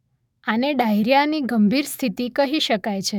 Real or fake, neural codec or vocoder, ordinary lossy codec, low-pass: fake; autoencoder, 48 kHz, 128 numbers a frame, DAC-VAE, trained on Japanese speech; none; 19.8 kHz